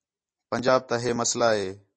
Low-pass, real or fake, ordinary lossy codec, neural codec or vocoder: 9.9 kHz; real; MP3, 32 kbps; none